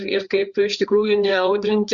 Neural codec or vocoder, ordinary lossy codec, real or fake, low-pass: codec, 16 kHz, 4 kbps, FreqCodec, larger model; Opus, 64 kbps; fake; 7.2 kHz